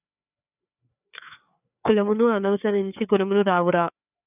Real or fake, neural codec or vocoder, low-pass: fake; codec, 16 kHz, 4 kbps, FreqCodec, larger model; 3.6 kHz